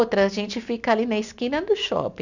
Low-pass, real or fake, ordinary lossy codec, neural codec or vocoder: 7.2 kHz; real; none; none